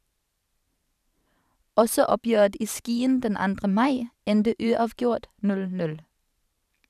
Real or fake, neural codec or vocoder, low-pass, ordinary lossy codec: fake; vocoder, 44.1 kHz, 128 mel bands every 512 samples, BigVGAN v2; 14.4 kHz; none